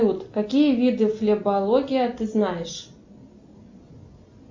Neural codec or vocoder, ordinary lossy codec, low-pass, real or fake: none; MP3, 48 kbps; 7.2 kHz; real